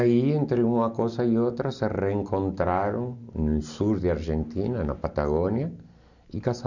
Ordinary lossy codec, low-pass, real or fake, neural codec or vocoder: none; 7.2 kHz; real; none